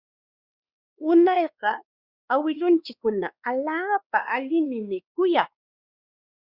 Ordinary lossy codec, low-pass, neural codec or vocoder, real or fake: Opus, 64 kbps; 5.4 kHz; codec, 16 kHz, 2 kbps, X-Codec, WavLM features, trained on Multilingual LibriSpeech; fake